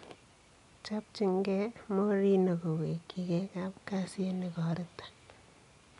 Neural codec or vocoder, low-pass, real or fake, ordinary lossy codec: none; 10.8 kHz; real; MP3, 96 kbps